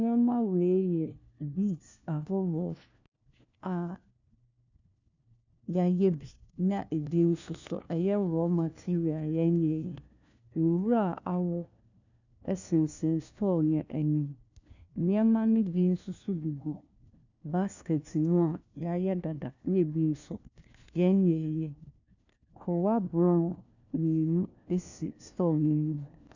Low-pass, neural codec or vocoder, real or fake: 7.2 kHz; codec, 16 kHz, 1 kbps, FunCodec, trained on LibriTTS, 50 frames a second; fake